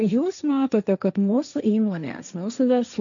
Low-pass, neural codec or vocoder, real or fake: 7.2 kHz; codec, 16 kHz, 1.1 kbps, Voila-Tokenizer; fake